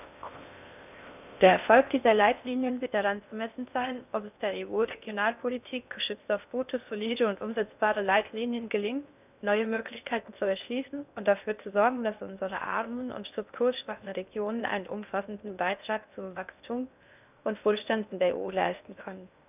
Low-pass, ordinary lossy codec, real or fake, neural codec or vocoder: 3.6 kHz; none; fake; codec, 16 kHz in and 24 kHz out, 0.6 kbps, FocalCodec, streaming, 4096 codes